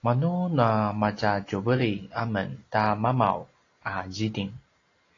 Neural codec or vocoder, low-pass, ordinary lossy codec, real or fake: none; 7.2 kHz; AAC, 32 kbps; real